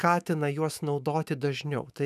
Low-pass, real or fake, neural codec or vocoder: 14.4 kHz; fake; autoencoder, 48 kHz, 128 numbers a frame, DAC-VAE, trained on Japanese speech